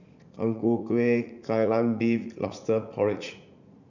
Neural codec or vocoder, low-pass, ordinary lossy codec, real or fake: vocoder, 44.1 kHz, 80 mel bands, Vocos; 7.2 kHz; none; fake